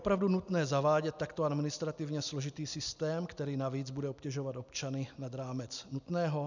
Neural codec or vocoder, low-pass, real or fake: none; 7.2 kHz; real